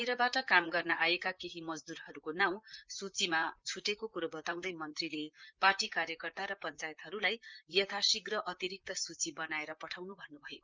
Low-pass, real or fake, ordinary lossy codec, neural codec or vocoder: 7.2 kHz; fake; Opus, 24 kbps; vocoder, 44.1 kHz, 128 mel bands, Pupu-Vocoder